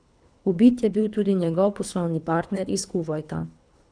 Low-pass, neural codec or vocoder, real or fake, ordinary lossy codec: 9.9 kHz; codec, 24 kHz, 3 kbps, HILCodec; fake; none